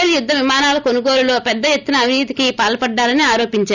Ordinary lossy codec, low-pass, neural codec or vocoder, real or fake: none; 7.2 kHz; none; real